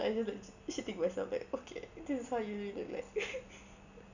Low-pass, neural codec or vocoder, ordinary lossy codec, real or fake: 7.2 kHz; none; none; real